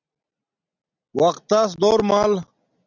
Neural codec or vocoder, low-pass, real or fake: none; 7.2 kHz; real